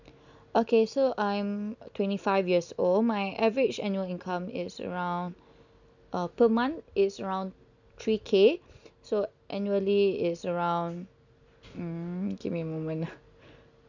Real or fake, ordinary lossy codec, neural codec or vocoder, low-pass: real; none; none; 7.2 kHz